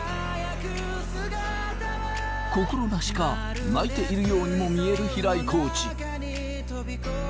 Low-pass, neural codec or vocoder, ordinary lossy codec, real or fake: none; none; none; real